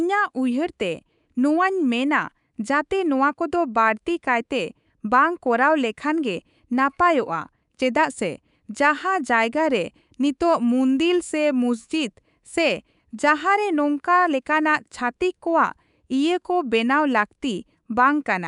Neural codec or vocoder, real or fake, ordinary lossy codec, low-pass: codec, 24 kHz, 3.1 kbps, DualCodec; fake; none; 10.8 kHz